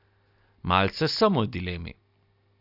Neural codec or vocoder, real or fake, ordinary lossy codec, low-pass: none; real; none; 5.4 kHz